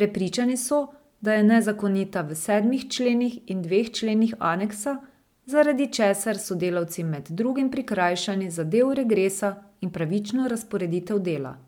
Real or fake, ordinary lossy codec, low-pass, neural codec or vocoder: real; MP3, 96 kbps; 19.8 kHz; none